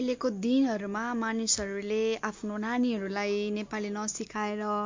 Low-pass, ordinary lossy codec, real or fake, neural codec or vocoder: 7.2 kHz; MP3, 48 kbps; real; none